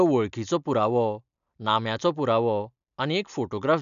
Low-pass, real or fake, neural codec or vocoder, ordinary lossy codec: 7.2 kHz; real; none; none